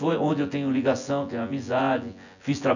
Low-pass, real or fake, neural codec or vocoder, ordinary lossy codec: 7.2 kHz; fake; vocoder, 24 kHz, 100 mel bands, Vocos; none